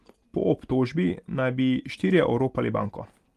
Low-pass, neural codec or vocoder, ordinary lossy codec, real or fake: 14.4 kHz; none; Opus, 24 kbps; real